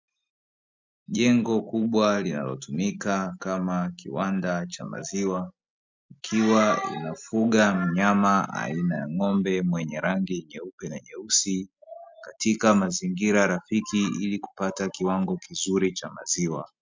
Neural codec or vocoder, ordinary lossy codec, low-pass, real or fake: none; MP3, 64 kbps; 7.2 kHz; real